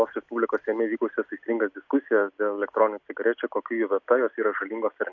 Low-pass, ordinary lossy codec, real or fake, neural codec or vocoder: 7.2 kHz; MP3, 64 kbps; real; none